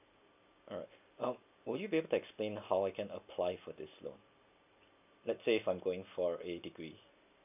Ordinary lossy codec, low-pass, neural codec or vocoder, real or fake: none; 3.6 kHz; none; real